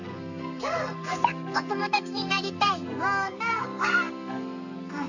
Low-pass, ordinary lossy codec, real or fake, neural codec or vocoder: 7.2 kHz; none; fake; codec, 32 kHz, 1.9 kbps, SNAC